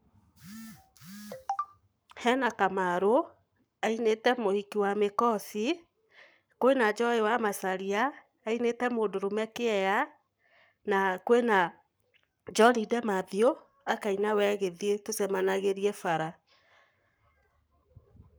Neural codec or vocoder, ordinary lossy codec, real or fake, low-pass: codec, 44.1 kHz, 7.8 kbps, Pupu-Codec; none; fake; none